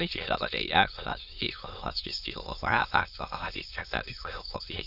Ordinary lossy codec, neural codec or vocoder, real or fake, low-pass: none; autoencoder, 22.05 kHz, a latent of 192 numbers a frame, VITS, trained on many speakers; fake; 5.4 kHz